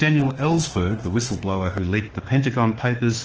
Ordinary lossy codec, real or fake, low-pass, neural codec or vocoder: Opus, 16 kbps; fake; 7.2 kHz; autoencoder, 48 kHz, 32 numbers a frame, DAC-VAE, trained on Japanese speech